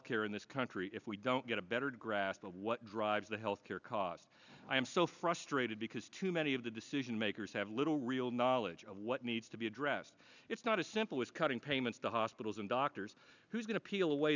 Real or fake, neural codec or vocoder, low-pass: real; none; 7.2 kHz